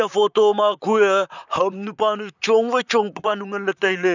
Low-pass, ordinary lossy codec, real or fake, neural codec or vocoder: 7.2 kHz; none; fake; vocoder, 44.1 kHz, 128 mel bands, Pupu-Vocoder